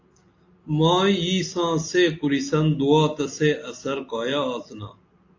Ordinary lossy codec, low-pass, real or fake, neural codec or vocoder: AAC, 48 kbps; 7.2 kHz; real; none